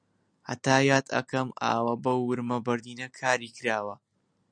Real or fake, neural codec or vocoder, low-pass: real; none; 9.9 kHz